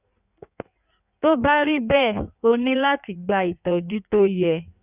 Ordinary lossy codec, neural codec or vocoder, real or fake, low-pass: none; codec, 16 kHz in and 24 kHz out, 1.1 kbps, FireRedTTS-2 codec; fake; 3.6 kHz